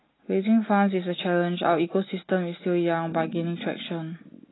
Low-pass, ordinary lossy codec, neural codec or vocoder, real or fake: 7.2 kHz; AAC, 16 kbps; none; real